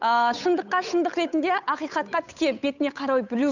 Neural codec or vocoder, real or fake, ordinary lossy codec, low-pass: codec, 16 kHz, 8 kbps, FunCodec, trained on Chinese and English, 25 frames a second; fake; none; 7.2 kHz